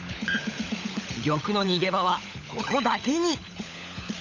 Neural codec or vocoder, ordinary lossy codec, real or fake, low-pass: codec, 16 kHz, 16 kbps, FunCodec, trained on LibriTTS, 50 frames a second; none; fake; 7.2 kHz